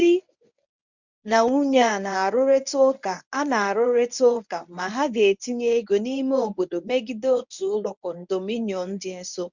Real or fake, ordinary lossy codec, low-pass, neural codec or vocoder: fake; none; 7.2 kHz; codec, 24 kHz, 0.9 kbps, WavTokenizer, medium speech release version 1